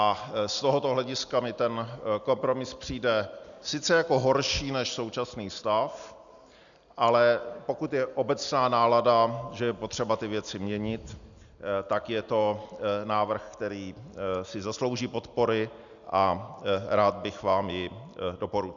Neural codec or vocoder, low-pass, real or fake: none; 7.2 kHz; real